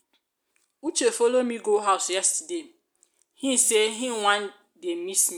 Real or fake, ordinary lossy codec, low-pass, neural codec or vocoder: real; none; none; none